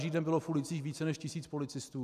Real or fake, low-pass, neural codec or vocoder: real; 14.4 kHz; none